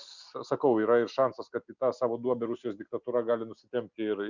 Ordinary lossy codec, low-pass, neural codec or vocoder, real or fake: Opus, 64 kbps; 7.2 kHz; none; real